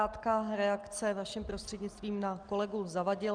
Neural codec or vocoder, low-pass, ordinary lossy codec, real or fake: none; 9.9 kHz; Opus, 32 kbps; real